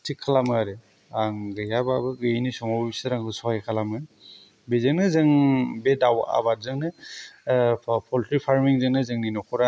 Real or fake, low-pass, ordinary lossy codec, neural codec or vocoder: real; none; none; none